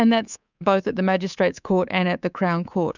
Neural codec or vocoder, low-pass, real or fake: codec, 24 kHz, 3.1 kbps, DualCodec; 7.2 kHz; fake